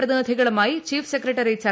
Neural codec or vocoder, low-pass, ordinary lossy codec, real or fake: none; none; none; real